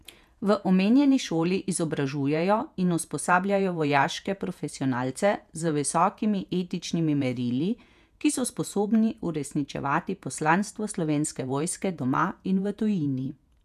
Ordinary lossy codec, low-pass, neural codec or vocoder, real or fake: none; 14.4 kHz; vocoder, 48 kHz, 128 mel bands, Vocos; fake